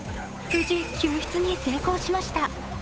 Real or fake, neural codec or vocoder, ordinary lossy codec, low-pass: fake; codec, 16 kHz, 8 kbps, FunCodec, trained on Chinese and English, 25 frames a second; none; none